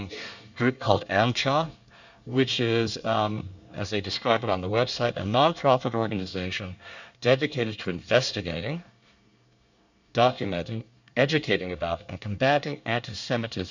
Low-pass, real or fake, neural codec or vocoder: 7.2 kHz; fake; codec, 24 kHz, 1 kbps, SNAC